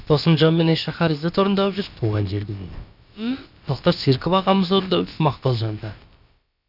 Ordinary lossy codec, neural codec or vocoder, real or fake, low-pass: none; codec, 16 kHz, about 1 kbps, DyCAST, with the encoder's durations; fake; 5.4 kHz